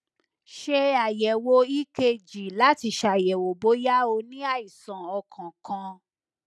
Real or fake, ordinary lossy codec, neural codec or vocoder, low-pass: real; none; none; none